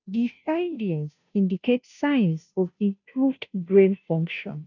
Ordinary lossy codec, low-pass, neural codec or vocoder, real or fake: none; 7.2 kHz; codec, 16 kHz, 0.5 kbps, FunCodec, trained on Chinese and English, 25 frames a second; fake